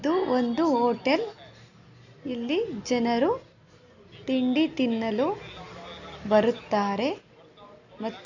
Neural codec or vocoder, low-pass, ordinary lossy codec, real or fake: none; 7.2 kHz; none; real